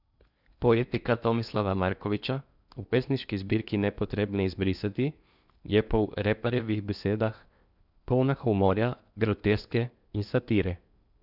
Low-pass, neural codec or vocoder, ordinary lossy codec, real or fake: 5.4 kHz; codec, 16 kHz in and 24 kHz out, 0.8 kbps, FocalCodec, streaming, 65536 codes; none; fake